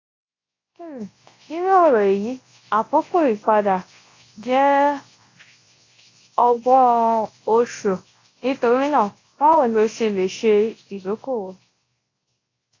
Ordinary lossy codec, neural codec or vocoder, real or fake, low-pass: AAC, 32 kbps; codec, 24 kHz, 0.9 kbps, WavTokenizer, large speech release; fake; 7.2 kHz